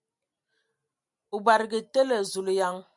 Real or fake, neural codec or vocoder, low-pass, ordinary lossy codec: real; none; 10.8 kHz; MP3, 96 kbps